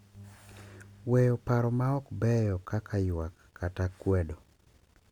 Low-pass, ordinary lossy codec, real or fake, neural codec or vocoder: 19.8 kHz; MP3, 96 kbps; real; none